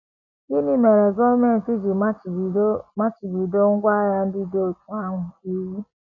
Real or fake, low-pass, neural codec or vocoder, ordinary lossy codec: real; 7.2 kHz; none; none